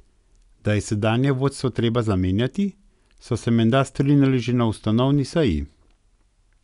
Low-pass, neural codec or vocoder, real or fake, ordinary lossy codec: 10.8 kHz; none; real; none